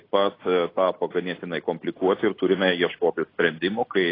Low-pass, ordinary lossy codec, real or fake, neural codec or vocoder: 5.4 kHz; AAC, 24 kbps; real; none